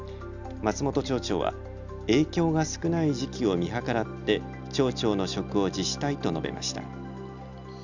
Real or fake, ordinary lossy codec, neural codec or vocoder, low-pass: real; none; none; 7.2 kHz